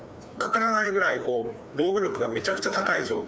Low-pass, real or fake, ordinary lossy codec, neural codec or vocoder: none; fake; none; codec, 16 kHz, 2 kbps, FreqCodec, larger model